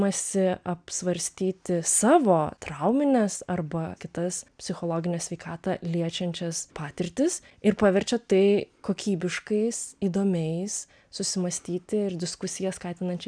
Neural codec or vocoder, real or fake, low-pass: none; real; 9.9 kHz